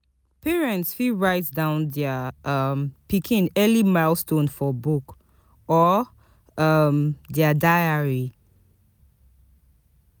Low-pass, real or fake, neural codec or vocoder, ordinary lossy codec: none; real; none; none